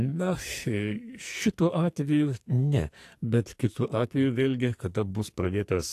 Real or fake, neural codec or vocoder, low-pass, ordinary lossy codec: fake; codec, 44.1 kHz, 2.6 kbps, SNAC; 14.4 kHz; AAC, 64 kbps